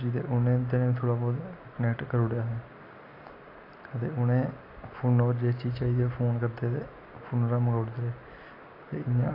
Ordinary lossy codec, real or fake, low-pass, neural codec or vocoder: AAC, 32 kbps; real; 5.4 kHz; none